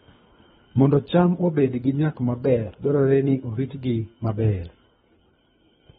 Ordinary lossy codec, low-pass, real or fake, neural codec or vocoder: AAC, 16 kbps; 10.8 kHz; fake; codec, 24 kHz, 3 kbps, HILCodec